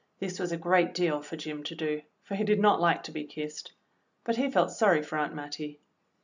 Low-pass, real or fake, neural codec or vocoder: 7.2 kHz; real; none